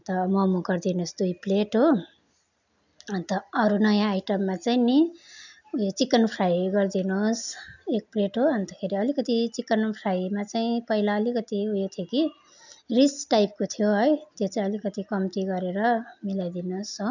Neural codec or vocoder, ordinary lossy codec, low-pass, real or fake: none; none; 7.2 kHz; real